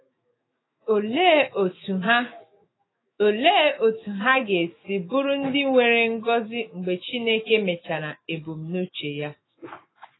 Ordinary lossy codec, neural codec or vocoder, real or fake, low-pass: AAC, 16 kbps; autoencoder, 48 kHz, 128 numbers a frame, DAC-VAE, trained on Japanese speech; fake; 7.2 kHz